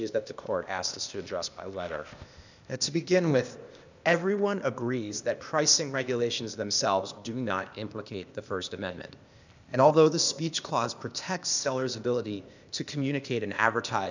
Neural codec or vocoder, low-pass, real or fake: codec, 16 kHz, 0.8 kbps, ZipCodec; 7.2 kHz; fake